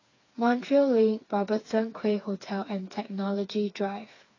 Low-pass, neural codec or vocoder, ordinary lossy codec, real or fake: 7.2 kHz; codec, 16 kHz, 4 kbps, FreqCodec, smaller model; AAC, 32 kbps; fake